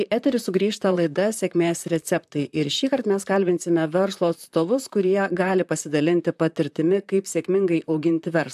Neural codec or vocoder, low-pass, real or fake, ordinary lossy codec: vocoder, 44.1 kHz, 128 mel bands, Pupu-Vocoder; 14.4 kHz; fake; AAC, 96 kbps